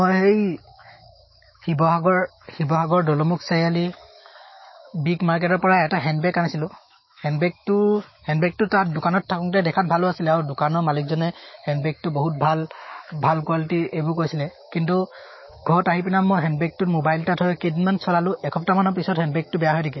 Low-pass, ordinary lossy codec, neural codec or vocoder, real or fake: 7.2 kHz; MP3, 24 kbps; autoencoder, 48 kHz, 128 numbers a frame, DAC-VAE, trained on Japanese speech; fake